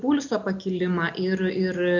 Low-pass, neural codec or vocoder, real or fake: 7.2 kHz; none; real